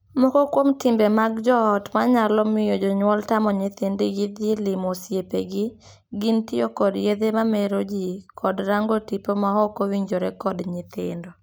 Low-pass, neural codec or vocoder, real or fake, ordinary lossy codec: none; none; real; none